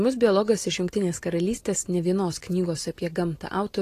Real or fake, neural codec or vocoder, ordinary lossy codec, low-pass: real; none; AAC, 48 kbps; 14.4 kHz